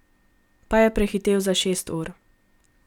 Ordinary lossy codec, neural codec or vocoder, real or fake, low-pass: none; none; real; 19.8 kHz